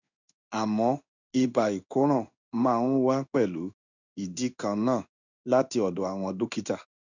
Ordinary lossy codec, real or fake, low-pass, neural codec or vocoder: none; fake; 7.2 kHz; codec, 16 kHz in and 24 kHz out, 1 kbps, XY-Tokenizer